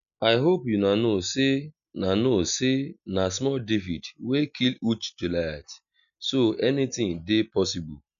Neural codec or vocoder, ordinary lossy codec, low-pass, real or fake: none; none; 7.2 kHz; real